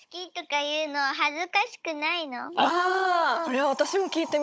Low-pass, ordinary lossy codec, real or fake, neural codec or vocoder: none; none; fake; codec, 16 kHz, 16 kbps, FunCodec, trained on Chinese and English, 50 frames a second